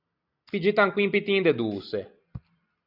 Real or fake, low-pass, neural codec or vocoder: real; 5.4 kHz; none